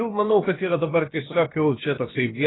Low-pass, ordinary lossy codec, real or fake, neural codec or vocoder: 7.2 kHz; AAC, 16 kbps; fake; codec, 16 kHz, about 1 kbps, DyCAST, with the encoder's durations